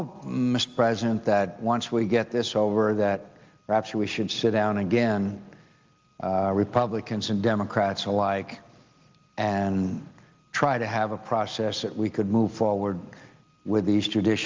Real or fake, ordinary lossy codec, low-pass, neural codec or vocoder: real; Opus, 32 kbps; 7.2 kHz; none